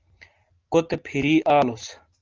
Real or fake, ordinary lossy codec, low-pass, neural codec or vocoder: real; Opus, 32 kbps; 7.2 kHz; none